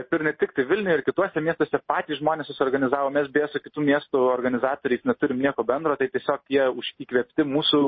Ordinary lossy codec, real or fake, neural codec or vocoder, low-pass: MP3, 24 kbps; real; none; 7.2 kHz